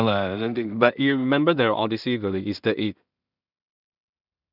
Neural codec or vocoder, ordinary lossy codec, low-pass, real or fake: codec, 16 kHz in and 24 kHz out, 0.4 kbps, LongCat-Audio-Codec, two codebook decoder; none; 5.4 kHz; fake